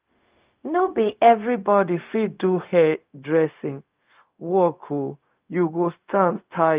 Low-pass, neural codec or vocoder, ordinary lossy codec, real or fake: 3.6 kHz; codec, 16 kHz, 0.4 kbps, LongCat-Audio-Codec; Opus, 24 kbps; fake